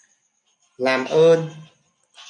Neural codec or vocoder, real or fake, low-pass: none; real; 9.9 kHz